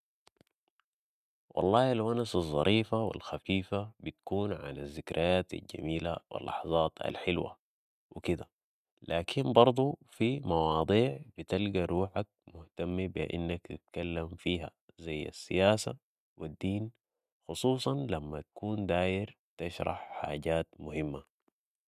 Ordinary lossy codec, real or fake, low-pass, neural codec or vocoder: none; real; 14.4 kHz; none